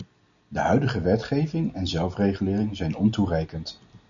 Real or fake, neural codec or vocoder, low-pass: real; none; 7.2 kHz